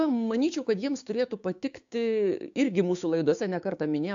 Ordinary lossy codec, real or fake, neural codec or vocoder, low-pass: AAC, 64 kbps; fake; codec, 16 kHz, 6 kbps, DAC; 7.2 kHz